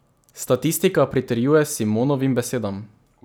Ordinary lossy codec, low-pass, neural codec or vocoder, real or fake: none; none; none; real